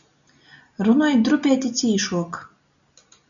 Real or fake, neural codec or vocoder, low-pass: real; none; 7.2 kHz